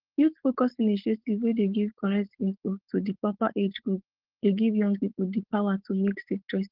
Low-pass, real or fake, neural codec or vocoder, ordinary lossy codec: 5.4 kHz; fake; codec, 16 kHz, 4.8 kbps, FACodec; Opus, 16 kbps